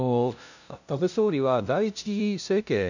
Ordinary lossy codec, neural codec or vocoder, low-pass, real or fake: none; codec, 16 kHz, 0.5 kbps, FunCodec, trained on LibriTTS, 25 frames a second; 7.2 kHz; fake